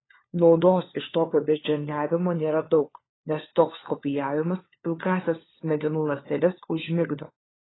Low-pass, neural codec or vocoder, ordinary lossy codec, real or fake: 7.2 kHz; codec, 16 kHz, 4 kbps, FunCodec, trained on LibriTTS, 50 frames a second; AAC, 16 kbps; fake